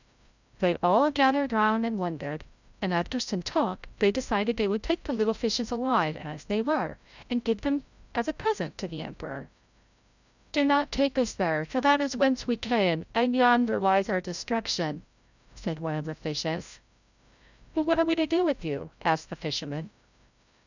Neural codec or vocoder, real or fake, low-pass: codec, 16 kHz, 0.5 kbps, FreqCodec, larger model; fake; 7.2 kHz